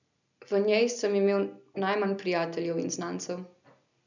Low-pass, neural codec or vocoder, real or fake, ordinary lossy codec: 7.2 kHz; none; real; none